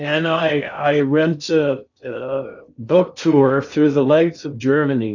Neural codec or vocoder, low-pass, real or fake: codec, 16 kHz in and 24 kHz out, 0.6 kbps, FocalCodec, streaming, 4096 codes; 7.2 kHz; fake